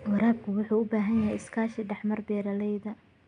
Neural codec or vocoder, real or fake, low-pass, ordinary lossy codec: none; real; 9.9 kHz; none